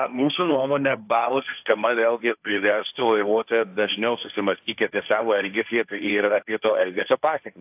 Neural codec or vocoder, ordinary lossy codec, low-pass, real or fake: codec, 16 kHz, 1.1 kbps, Voila-Tokenizer; AAC, 32 kbps; 3.6 kHz; fake